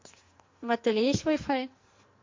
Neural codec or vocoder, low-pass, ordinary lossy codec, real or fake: codec, 44.1 kHz, 2.6 kbps, SNAC; 7.2 kHz; MP3, 48 kbps; fake